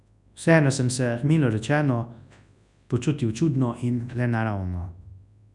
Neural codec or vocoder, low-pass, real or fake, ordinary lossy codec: codec, 24 kHz, 0.9 kbps, WavTokenizer, large speech release; 10.8 kHz; fake; none